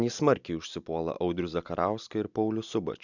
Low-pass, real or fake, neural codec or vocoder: 7.2 kHz; real; none